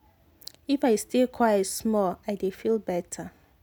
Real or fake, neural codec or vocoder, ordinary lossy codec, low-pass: real; none; none; none